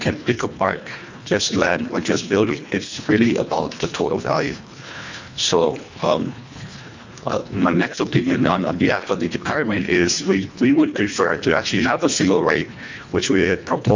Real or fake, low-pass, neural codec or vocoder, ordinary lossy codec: fake; 7.2 kHz; codec, 24 kHz, 1.5 kbps, HILCodec; MP3, 64 kbps